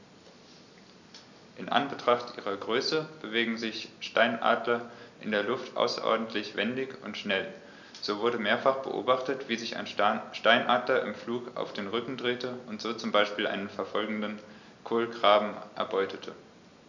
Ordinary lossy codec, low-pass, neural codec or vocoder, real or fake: none; 7.2 kHz; none; real